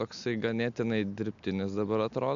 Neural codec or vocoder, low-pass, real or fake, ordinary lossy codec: none; 7.2 kHz; real; MP3, 64 kbps